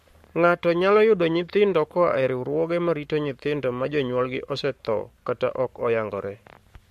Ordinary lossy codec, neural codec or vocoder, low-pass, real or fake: MP3, 64 kbps; codec, 44.1 kHz, 7.8 kbps, Pupu-Codec; 14.4 kHz; fake